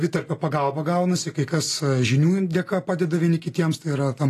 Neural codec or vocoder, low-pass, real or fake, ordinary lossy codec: none; 14.4 kHz; real; AAC, 48 kbps